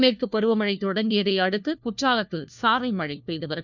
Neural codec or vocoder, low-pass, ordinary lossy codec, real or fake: codec, 16 kHz, 1 kbps, FunCodec, trained on LibriTTS, 50 frames a second; 7.2 kHz; none; fake